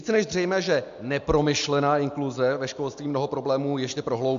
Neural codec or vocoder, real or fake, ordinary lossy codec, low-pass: none; real; MP3, 64 kbps; 7.2 kHz